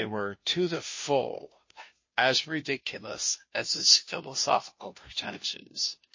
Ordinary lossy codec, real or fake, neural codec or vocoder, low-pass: MP3, 32 kbps; fake; codec, 16 kHz, 0.5 kbps, FunCodec, trained on Chinese and English, 25 frames a second; 7.2 kHz